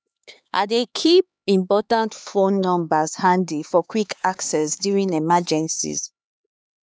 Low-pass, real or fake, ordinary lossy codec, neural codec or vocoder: none; fake; none; codec, 16 kHz, 4 kbps, X-Codec, HuBERT features, trained on LibriSpeech